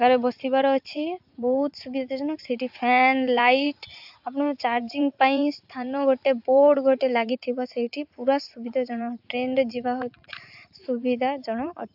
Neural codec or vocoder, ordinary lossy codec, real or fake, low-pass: vocoder, 44.1 kHz, 80 mel bands, Vocos; AAC, 48 kbps; fake; 5.4 kHz